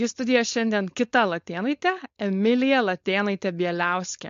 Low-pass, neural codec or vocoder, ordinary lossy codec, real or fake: 7.2 kHz; codec, 16 kHz, 4.8 kbps, FACodec; MP3, 48 kbps; fake